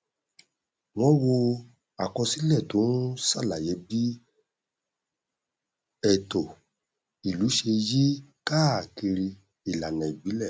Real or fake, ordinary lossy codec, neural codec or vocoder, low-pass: real; none; none; none